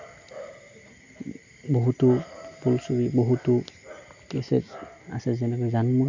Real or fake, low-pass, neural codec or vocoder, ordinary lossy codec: real; 7.2 kHz; none; MP3, 64 kbps